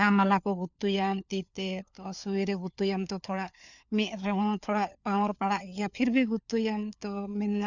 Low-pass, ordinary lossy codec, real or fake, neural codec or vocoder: 7.2 kHz; none; fake; codec, 16 kHz, 4 kbps, FunCodec, trained on LibriTTS, 50 frames a second